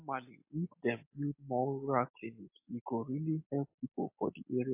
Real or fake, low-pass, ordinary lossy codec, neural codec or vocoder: real; 3.6 kHz; MP3, 24 kbps; none